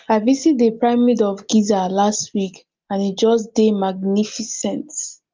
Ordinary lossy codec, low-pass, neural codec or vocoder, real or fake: Opus, 32 kbps; 7.2 kHz; none; real